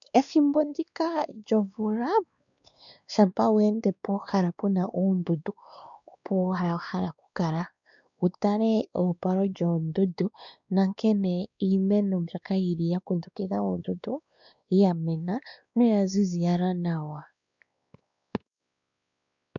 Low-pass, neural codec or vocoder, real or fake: 7.2 kHz; codec, 16 kHz, 2 kbps, X-Codec, WavLM features, trained on Multilingual LibriSpeech; fake